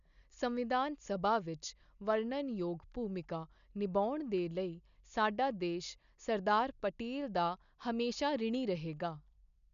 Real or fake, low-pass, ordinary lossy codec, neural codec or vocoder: real; 7.2 kHz; none; none